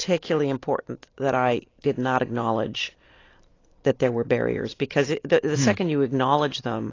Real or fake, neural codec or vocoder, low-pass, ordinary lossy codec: real; none; 7.2 kHz; AAC, 32 kbps